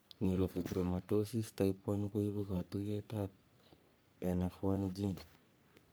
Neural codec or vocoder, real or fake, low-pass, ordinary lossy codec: codec, 44.1 kHz, 3.4 kbps, Pupu-Codec; fake; none; none